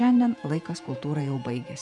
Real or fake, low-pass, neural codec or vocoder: fake; 10.8 kHz; vocoder, 48 kHz, 128 mel bands, Vocos